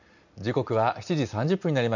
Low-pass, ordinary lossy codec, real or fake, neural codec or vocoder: 7.2 kHz; none; real; none